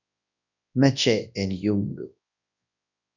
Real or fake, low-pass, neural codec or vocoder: fake; 7.2 kHz; codec, 24 kHz, 0.9 kbps, WavTokenizer, large speech release